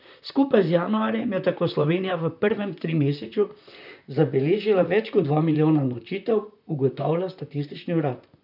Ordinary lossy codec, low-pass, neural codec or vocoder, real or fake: none; 5.4 kHz; vocoder, 44.1 kHz, 128 mel bands, Pupu-Vocoder; fake